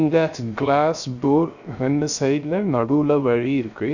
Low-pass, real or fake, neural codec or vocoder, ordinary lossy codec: 7.2 kHz; fake; codec, 16 kHz, 0.3 kbps, FocalCodec; none